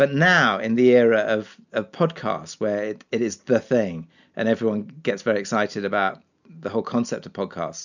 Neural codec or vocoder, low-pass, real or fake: none; 7.2 kHz; real